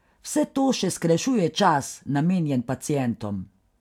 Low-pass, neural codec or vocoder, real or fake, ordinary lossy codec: 19.8 kHz; none; real; none